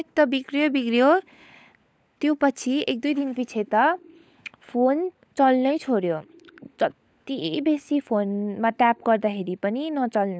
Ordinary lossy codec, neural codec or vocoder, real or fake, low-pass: none; codec, 16 kHz, 16 kbps, FunCodec, trained on LibriTTS, 50 frames a second; fake; none